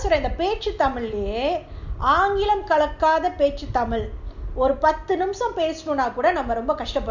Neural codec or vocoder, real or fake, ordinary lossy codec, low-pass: none; real; none; 7.2 kHz